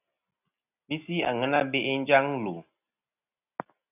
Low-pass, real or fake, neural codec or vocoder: 3.6 kHz; real; none